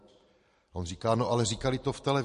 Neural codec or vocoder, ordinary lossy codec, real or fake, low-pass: none; MP3, 48 kbps; real; 14.4 kHz